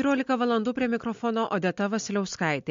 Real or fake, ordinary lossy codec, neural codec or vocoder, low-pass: real; MP3, 48 kbps; none; 7.2 kHz